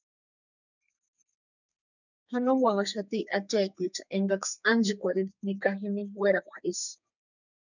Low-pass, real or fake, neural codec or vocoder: 7.2 kHz; fake; codec, 32 kHz, 1.9 kbps, SNAC